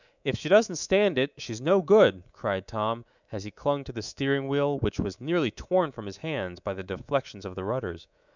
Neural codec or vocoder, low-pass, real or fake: codec, 24 kHz, 3.1 kbps, DualCodec; 7.2 kHz; fake